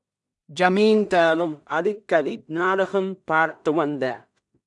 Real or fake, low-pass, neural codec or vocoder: fake; 10.8 kHz; codec, 16 kHz in and 24 kHz out, 0.4 kbps, LongCat-Audio-Codec, two codebook decoder